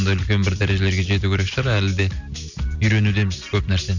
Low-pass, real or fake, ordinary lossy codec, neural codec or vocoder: 7.2 kHz; real; none; none